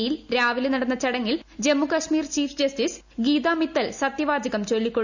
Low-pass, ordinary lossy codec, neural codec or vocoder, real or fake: 7.2 kHz; none; none; real